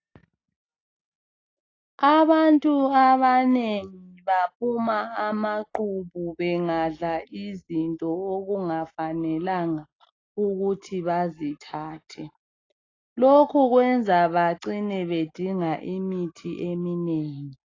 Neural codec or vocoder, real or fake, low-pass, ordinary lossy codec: none; real; 7.2 kHz; AAC, 32 kbps